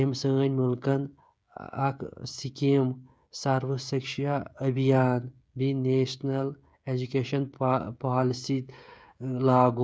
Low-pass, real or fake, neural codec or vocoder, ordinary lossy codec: none; fake; codec, 16 kHz, 16 kbps, FreqCodec, smaller model; none